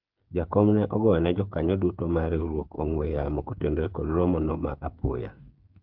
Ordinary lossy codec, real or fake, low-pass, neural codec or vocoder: Opus, 24 kbps; fake; 5.4 kHz; codec, 16 kHz, 8 kbps, FreqCodec, smaller model